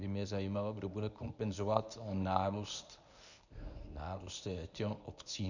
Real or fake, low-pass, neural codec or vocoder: fake; 7.2 kHz; codec, 24 kHz, 0.9 kbps, WavTokenizer, medium speech release version 1